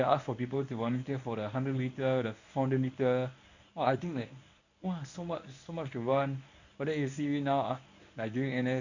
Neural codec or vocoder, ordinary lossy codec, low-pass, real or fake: codec, 24 kHz, 0.9 kbps, WavTokenizer, medium speech release version 1; none; 7.2 kHz; fake